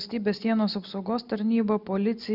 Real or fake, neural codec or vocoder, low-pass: real; none; 5.4 kHz